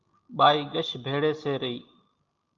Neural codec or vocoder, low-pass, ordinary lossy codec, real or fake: none; 7.2 kHz; Opus, 24 kbps; real